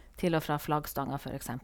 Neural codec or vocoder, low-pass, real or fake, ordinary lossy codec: none; none; real; none